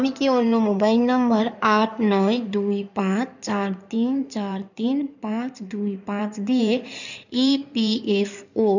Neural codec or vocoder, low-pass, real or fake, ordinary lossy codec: codec, 16 kHz in and 24 kHz out, 2.2 kbps, FireRedTTS-2 codec; 7.2 kHz; fake; none